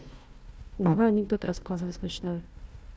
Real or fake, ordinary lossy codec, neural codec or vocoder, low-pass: fake; none; codec, 16 kHz, 1 kbps, FunCodec, trained on Chinese and English, 50 frames a second; none